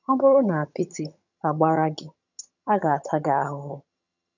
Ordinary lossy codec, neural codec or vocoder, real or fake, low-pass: none; vocoder, 22.05 kHz, 80 mel bands, HiFi-GAN; fake; 7.2 kHz